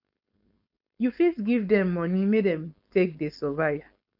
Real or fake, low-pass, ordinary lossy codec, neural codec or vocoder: fake; 5.4 kHz; none; codec, 16 kHz, 4.8 kbps, FACodec